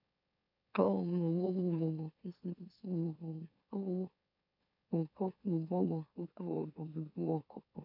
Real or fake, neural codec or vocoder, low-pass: fake; autoencoder, 44.1 kHz, a latent of 192 numbers a frame, MeloTTS; 5.4 kHz